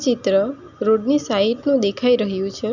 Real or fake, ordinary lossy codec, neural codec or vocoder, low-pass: real; none; none; 7.2 kHz